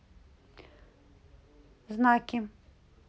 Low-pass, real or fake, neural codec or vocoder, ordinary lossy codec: none; real; none; none